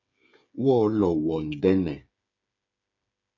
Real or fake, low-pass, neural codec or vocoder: fake; 7.2 kHz; codec, 16 kHz, 8 kbps, FreqCodec, smaller model